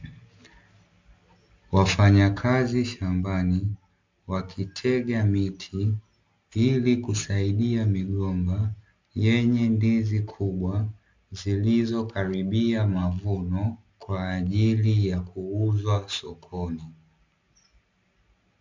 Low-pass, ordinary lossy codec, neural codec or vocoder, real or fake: 7.2 kHz; MP3, 64 kbps; none; real